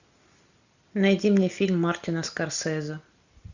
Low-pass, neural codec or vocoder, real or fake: 7.2 kHz; none; real